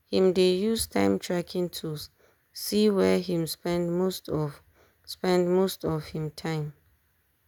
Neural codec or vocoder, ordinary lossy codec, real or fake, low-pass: none; none; real; 19.8 kHz